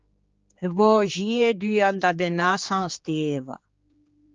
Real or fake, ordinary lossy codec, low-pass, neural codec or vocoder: fake; Opus, 24 kbps; 7.2 kHz; codec, 16 kHz, 4 kbps, X-Codec, HuBERT features, trained on general audio